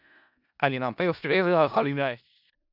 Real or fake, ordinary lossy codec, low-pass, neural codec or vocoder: fake; none; 5.4 kHz; codec, 16 kHz in and 24 kHz out, 0.4 kbps, LongCat-Audio-Codec, four codebook decoder